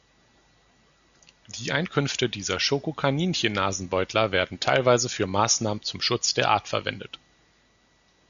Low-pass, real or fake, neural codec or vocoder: 7.2 kHz; real; none